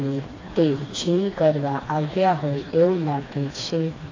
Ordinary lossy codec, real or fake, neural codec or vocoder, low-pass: AAC, 32 kbps; fake; codec, 16 kHz, 2 kbps, FreqCodec, smaller model; 7.2 kHz